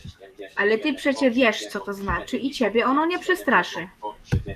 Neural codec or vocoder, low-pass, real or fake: codec, 44.1 kHz, 7.8 kbps, DAC; 14.4 kHz; fake